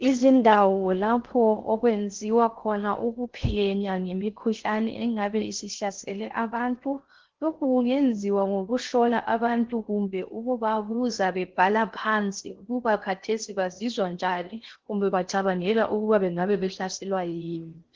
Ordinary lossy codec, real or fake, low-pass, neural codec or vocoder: Opus, 16 kbps; fake; 7.2 kHz; codec, 16 kHz in and 24 kHz out, 0.6 kbps, FocalCodec, streaming, 4096 codes